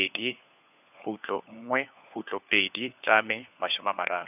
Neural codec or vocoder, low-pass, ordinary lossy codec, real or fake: codec, 16 kHz, 4 kbps, FunCodec, trained on LibriTTS, 50 frames a second; 3.6 kHz; none; fake